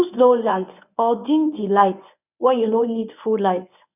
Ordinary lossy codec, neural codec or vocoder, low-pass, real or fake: none; codec, 24 kHz, 0.9 kbps, WavTokenizer, medium speech release version 1; 3.6 kHz; fake